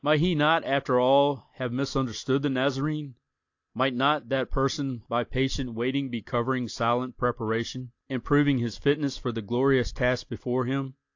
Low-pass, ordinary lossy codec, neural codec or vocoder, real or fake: 7.2 kHz; AAC, 48 kbps; none; real